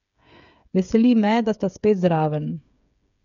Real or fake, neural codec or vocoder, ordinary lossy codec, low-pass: fake; codec, 16 kHz, 8 kbps, FreqCodec, smaller model; none; 7.2 kHz